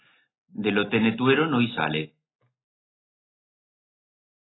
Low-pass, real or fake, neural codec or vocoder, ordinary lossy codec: 7.2 kHz; real; none; AAC, 16 kbps